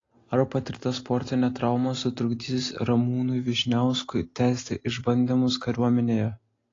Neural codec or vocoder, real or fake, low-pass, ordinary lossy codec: none; real; 7.2 kHz; AAC, 32 kbps